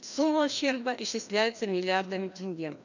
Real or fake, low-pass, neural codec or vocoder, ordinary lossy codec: fake; 7.2 kHz; codec, 16 kHz, 1 kbps, FreqCodec, larger model; none